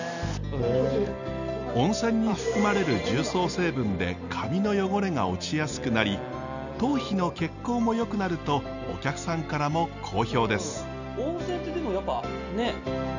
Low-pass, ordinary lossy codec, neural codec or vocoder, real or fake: 7.2 kHz; none; none; real